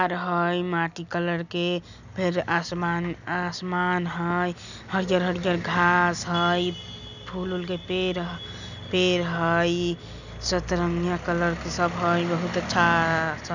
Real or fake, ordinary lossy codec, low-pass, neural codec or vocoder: real; none; 7.2 kHz; none